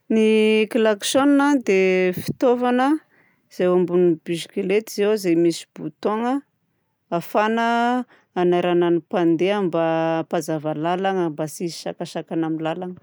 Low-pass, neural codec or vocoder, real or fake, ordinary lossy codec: none; none; real; none